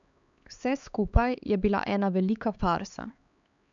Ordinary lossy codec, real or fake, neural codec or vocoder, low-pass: none; fake; codec, 16 kHz, 4 kbps, X-Codec, HuBERT features, trained on LibriSpeech; 7.2 kHz